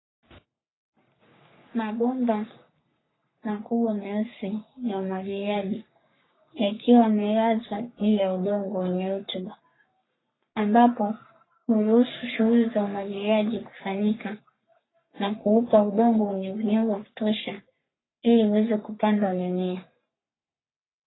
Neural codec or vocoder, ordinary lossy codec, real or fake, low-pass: codec, 44.1 kHz, 3.4 kbps, Pupu-Codec; AAC, 16 kbps; fake; 7.2 kHz